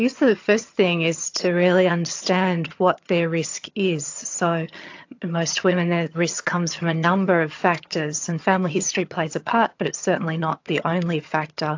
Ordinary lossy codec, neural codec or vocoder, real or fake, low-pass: AAC, 48 kbps; vocoder, 22.05 kHz, 80 mel bands, HiFi-GAN; fake; 7.2 kHz